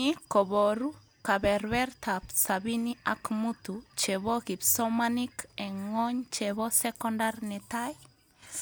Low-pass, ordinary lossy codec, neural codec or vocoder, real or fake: none; none; none; real